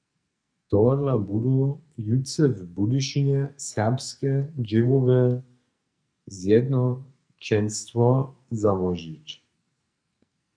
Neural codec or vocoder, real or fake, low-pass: codec, 44.1 kHz, 2.6 kbps, SNAC; fake; 9.9 kHz